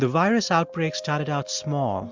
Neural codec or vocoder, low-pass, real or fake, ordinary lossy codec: none; 7.2 kHz; real; MP3, 64 kbps